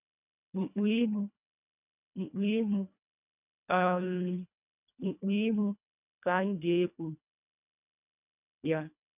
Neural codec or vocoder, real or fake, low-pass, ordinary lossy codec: codec, 24 kHz, 1.5 kbps, HILCodec; fake; 3.6 kHz; none